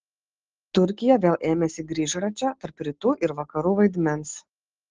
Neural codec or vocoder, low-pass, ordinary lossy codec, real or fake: none; 7.2 kHz; Opus, 16 kbps; real